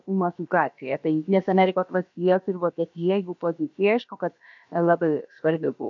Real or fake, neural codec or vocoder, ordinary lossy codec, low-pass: fake; codec, 16 kHz, about 1 kbps, DyCAST, with the encoder's durations; MP3, 48 kbps; 7.2 kHz